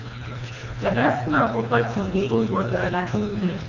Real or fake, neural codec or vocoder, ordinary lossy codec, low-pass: fake; codec, 24 kHz, 1.5 kbps, HILCodec; none; 7.2 kHz